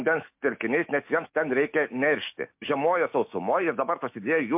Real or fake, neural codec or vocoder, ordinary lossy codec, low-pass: fake; autoencoder, 48 kHz, 128 numbers a frame, DAC-VAE, trained on Japanese speech; MP3, 32 kbps; 3.6 kHz